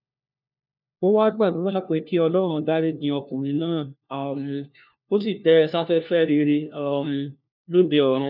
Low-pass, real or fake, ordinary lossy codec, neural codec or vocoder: 5.4 kHz; fake; none; codec, 16 kHz, 1 kbps, FunCodec, trained on LibriTTS, 50 frames a second